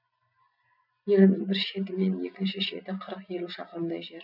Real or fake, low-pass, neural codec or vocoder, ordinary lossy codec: real; 5.4 kHz; none; none